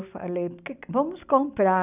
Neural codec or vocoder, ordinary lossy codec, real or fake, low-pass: none; none; real; 3.6 kHz